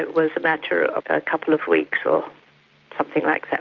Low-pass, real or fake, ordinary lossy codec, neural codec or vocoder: 7.2 kHz; real; Opus, 24 kbps; none